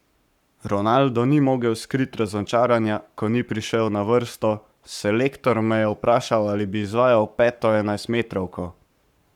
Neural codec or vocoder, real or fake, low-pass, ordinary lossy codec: codec, 44.1 kHz, 7.8 kbps, Pupu-Codec; fake; 19.8 kHz; none